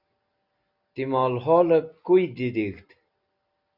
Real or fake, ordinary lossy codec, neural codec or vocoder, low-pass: real; Opus, 64 kbps; none; 5.4 kHz